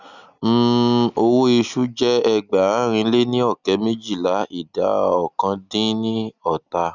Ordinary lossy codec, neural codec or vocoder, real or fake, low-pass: none; none; real; 7.2 kHz